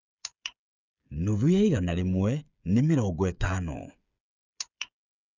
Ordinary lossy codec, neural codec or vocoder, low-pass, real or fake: none; codec, 16 kHz, 8 kbps, FreqCodec, smaller model; 7.2 kHz; fake